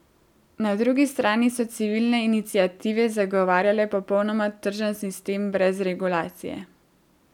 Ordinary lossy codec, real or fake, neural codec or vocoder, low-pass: none; real; none; 19.8 kHz